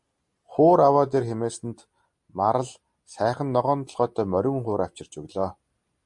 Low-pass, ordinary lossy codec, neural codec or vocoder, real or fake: 10.8 kHz; MP3, 48 kbps; none; real